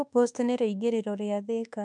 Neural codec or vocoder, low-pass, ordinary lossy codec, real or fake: codec, 24 kHz, 1.2 kbps, DualCodec; 10.8 kHz; MP3, 96 kbps; fake